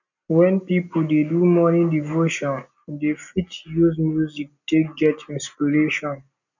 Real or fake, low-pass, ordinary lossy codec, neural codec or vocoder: real; 7.2 kHz; none; none